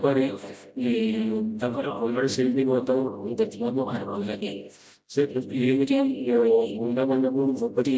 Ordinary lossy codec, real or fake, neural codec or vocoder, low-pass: none; fake; codec, 16 kHz, 0.5 kbps, FreqCodec, smaller model; none